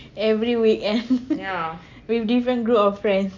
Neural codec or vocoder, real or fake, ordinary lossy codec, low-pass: none; real; MP3, 64 kbps; 7.2 kHz